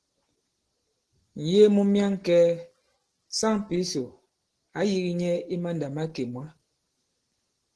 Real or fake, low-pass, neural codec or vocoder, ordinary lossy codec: real; 10.8 kHz; none; Opus, 16 kbps